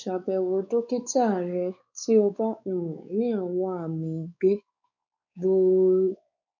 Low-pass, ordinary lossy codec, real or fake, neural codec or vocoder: 7.2 kHz; none; fake; codec, 16 kHz, 4 kbps, X-Codec, WavLM features, trained on Multilingual LibriSpeech